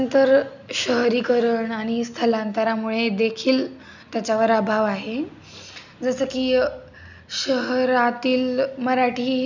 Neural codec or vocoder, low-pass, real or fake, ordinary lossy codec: none; 7.2 kHz; real; none